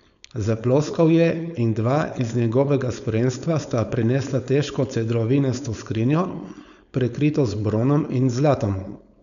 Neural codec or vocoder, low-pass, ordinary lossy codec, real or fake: codec, 16 kHz, 4.8 kbps, FACodec; 7.2 kHz; none; fake